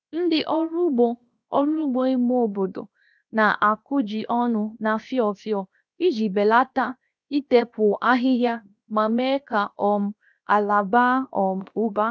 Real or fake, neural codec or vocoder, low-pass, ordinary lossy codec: fake; codec, 16 kHz, 0.7 kbps, FocalCodec; none; none